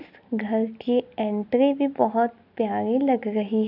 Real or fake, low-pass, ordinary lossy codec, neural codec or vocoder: real; 5.4 kHz; none; none